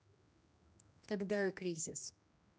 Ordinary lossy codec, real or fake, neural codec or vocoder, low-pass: none; fake; codec, 16 kHz, 1 kbps, X-Codec, HuBERT features, trained on general audio; none